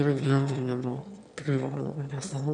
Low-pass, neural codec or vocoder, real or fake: 9.9 kHz; autoencoder, 22.05 kHz, a latent of 192 numbers a frame, VITS, trained on one speaker; fake